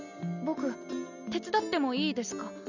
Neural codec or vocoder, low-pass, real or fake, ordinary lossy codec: none; 7.2 kHz; real; none